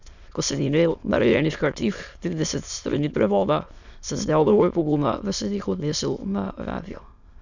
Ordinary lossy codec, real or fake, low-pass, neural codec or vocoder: none; fake; 7.2 kHz; autoencoder, 22.05 kHz, a latent of 192 numbers a frame, VITS, trained on many speakers